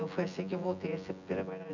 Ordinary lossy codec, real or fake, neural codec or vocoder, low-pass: none; fake; vocoder, 24 kHz, 100 mel bands, Vocos; 7.2 kHz